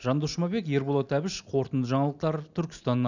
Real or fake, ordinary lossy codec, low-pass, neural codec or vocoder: real; none; 7.2 kHz; none